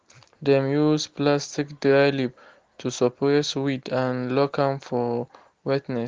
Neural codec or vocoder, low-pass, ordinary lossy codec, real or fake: none; 7.2 kHz; Opus, 16 kbps; real